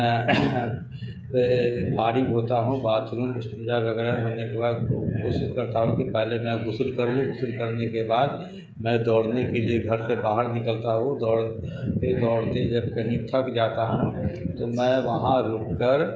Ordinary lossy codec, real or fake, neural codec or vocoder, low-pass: none; fake; codec, 16 kHz, 8 kbps, FreqCodec, smaller model; none